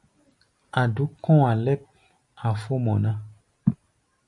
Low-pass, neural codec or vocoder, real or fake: 10.8 kHz; none; real